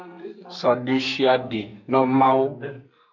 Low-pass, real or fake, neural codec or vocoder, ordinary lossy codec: 7.2 kHz; fake; codec, 44.1 kHz, 2.6 kbps, SNAC; MP3, 64 kbps